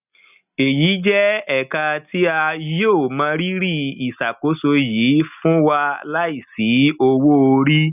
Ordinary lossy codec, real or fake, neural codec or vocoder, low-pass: none; real; none; 3.6 kHz